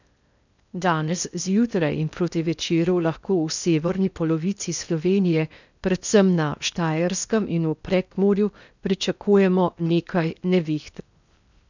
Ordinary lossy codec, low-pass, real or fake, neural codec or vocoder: none; 7.2 kHz; fake; codec, 16 kHz in and 24 kHz out, 0.8 kbps, FocalCodec, streaming, 65536 codes